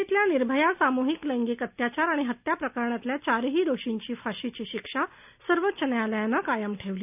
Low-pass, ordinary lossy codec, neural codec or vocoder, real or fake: 3.6 kHz; none; none; real